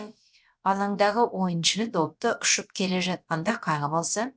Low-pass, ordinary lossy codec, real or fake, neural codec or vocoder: none; none; fake; codec, 16 kHz, about 1 kbps, DyCAST, with the encoder's durations